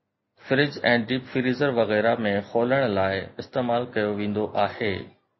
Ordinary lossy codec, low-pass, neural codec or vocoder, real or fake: MP3, 24 kbps; 7.2 kHz; none; real